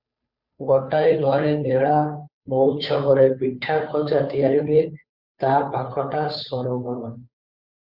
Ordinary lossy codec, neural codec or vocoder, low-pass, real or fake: Opus, 64 kbps; codec, 16 kHz, 2 kbps, FunCodec, trained on Chinese and English, 25 frames a second; 5.4 kHz; fake